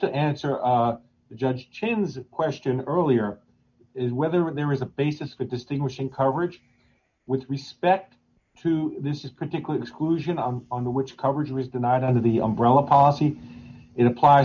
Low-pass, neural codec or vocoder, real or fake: 7.2 kHz; none; real